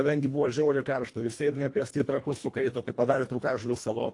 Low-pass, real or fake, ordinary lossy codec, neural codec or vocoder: 10.8 kHz; fake; AAC, 48 kbps; codec, 24 kHz, 1.5 kbps, HILCodec